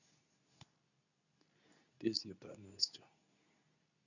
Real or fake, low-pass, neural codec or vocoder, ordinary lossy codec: fake; 7.2 kHz; codec, 24 kHz, 0.9 kbps, WavTokenizer, medium speech release version 2; none